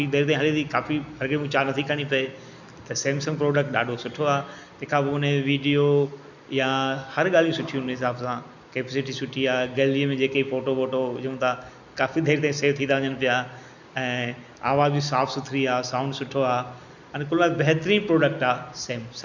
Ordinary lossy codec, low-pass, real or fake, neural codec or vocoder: none; 7.2 kHz; real; none